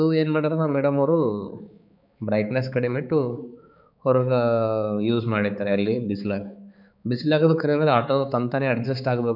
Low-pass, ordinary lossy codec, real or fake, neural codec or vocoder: 5.4 kHz; none; fake; codec, 16 kHz, 4 kbps, X-Codec, HuBERT features, trained on balanced general audio